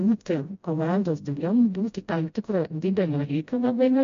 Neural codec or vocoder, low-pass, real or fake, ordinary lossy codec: codec, 16 kHz, 0.5 kbps, FreqCodec, smaller model; 7.2 kHz; fake; MP3, 48 kbps